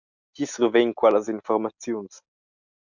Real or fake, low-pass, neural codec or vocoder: real; 7.2 kHz; none